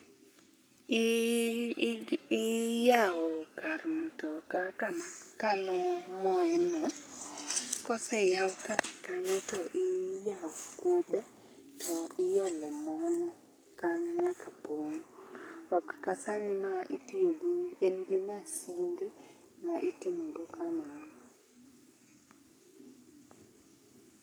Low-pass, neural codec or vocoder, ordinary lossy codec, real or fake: none; codec, 44.1 kHz, 3.4 kbps, Pupu-Codec; none; fake